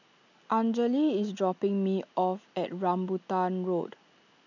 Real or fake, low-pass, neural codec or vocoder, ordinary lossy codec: real; 7.2 kHz; none; none